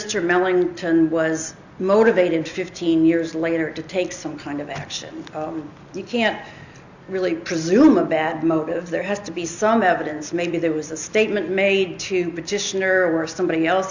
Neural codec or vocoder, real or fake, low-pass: none; real; 7.2 kHz